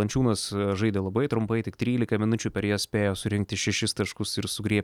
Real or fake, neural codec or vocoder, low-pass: real; none; 19.8 kHz